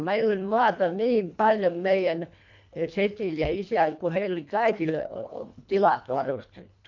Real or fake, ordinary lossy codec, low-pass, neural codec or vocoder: fake; MP3, 64 kbps; 7.2 kHz; codec, 24 kHz, 1.5 kbps, HILCodec